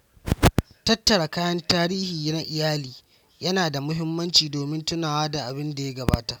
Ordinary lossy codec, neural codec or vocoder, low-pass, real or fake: none; none; 19.8 kHz; real